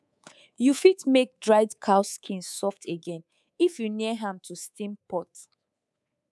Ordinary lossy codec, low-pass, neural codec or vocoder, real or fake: none; none; codec, 24 kHz, 3.1 kbps, DualCodec; fake